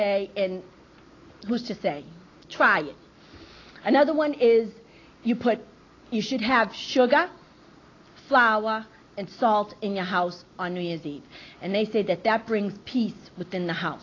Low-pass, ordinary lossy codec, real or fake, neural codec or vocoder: 7.2 kHz; AAC, 32 kbps; real; none